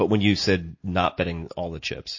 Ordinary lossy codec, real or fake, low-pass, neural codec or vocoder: MP3, 32 kbps; real; 7.2 kHz; none